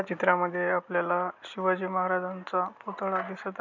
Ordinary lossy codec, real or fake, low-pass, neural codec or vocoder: none; real; 7.2 kHz; none